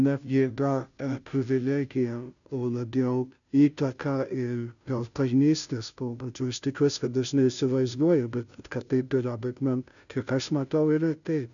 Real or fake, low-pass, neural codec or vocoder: fake; 7.2 kHz; codec, 16 kHz, 0.5 kbps, FunCodec, trained on Chinese and English, 25 frames a second